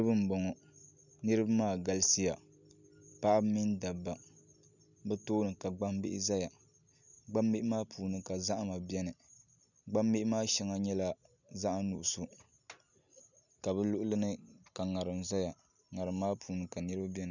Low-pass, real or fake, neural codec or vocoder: 7.2 kHz; real; none